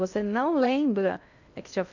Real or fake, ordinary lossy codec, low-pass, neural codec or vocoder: fake; none; 7.2 kHz; codec, 16 kHz in and 24 kHz out, 0.6 kbps, FocalCodec, streaming, 2048 codes